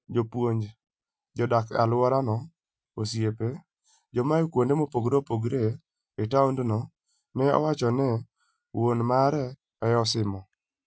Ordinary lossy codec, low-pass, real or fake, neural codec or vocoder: none; none; real; none